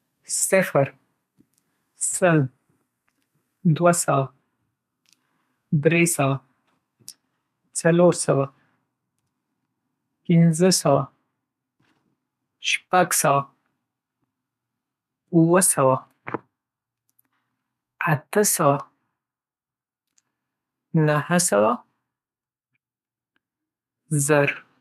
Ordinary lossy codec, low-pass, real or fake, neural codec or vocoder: MP3, 96 kbps; 14.4 kHz; fake; codec, 32 kHz, 1.9 kbps, SNAC